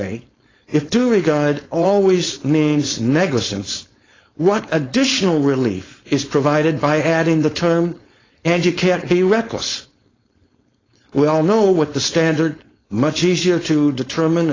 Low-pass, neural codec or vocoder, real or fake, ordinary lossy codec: 7.2 kHz; codec, 16 kHz, 4.8 kbps, FACodec; fake; AAC, 32 kbps